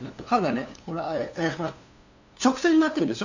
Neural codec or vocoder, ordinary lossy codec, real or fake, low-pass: codec, 16 kHz, 2 kbps, FunCodec, trained on LibriTTS, 25 frames a second; none; fake; 7.2 kHz